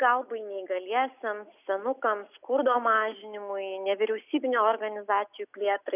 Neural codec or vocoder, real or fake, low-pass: none; real; 3.6 kHz